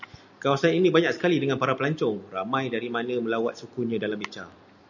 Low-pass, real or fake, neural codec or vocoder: 7.2 kHz; real; none